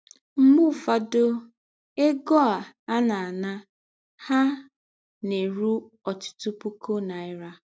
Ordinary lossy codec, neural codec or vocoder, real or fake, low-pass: none; none; real; none